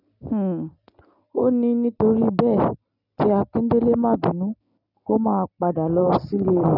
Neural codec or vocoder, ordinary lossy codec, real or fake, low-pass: none; none; real; 5.4 kHz